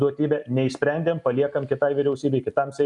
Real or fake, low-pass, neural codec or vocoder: real; 10.8 kHz; none